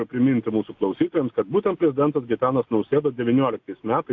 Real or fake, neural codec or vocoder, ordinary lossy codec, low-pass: real; none; AAC, 48 kbps; 7.2 kHz